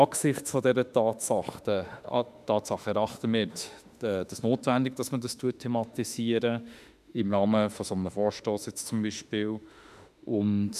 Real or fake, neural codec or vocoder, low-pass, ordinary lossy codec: fake; autoencoder, 48 kHz, 32 numbers a frame, DAC-VAE, trained on Japanese speech; 14.4 kHz; none